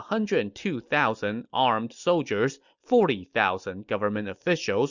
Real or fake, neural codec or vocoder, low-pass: real; none; 7.2 kHz